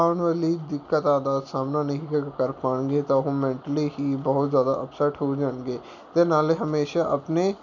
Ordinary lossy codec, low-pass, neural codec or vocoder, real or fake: none; 7.2 kHz; none; real